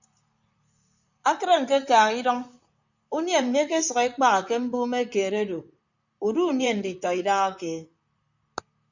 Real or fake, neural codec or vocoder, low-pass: fake; vocoder, 44.1 kHz, 128 mel bands, Pupu-Vocoder; 7.2 kHz